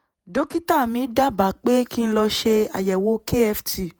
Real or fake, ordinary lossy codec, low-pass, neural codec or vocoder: fake; none; none; vocoder, 48 kHz, 128 mel bands, Vocos